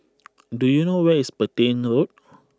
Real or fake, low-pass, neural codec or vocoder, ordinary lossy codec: real; none; none; none